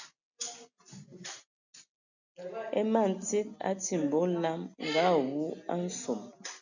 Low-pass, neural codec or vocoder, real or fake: 7.2 kHz; none; real